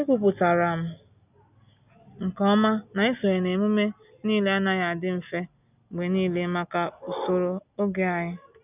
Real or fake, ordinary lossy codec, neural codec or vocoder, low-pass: real; none; none; 3.6 kHz